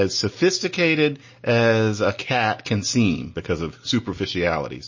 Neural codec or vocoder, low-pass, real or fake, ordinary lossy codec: none; 7.2 kHz; real; MP3, 32 kbps